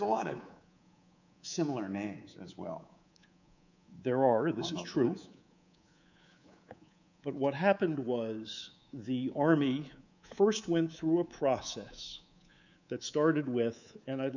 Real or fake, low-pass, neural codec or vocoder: fake; 7.2 kHz; codec, 24 kHz, 3.1 kbps, DualCodec